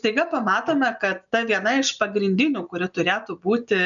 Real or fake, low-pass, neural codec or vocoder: real; 7.2 kHz; none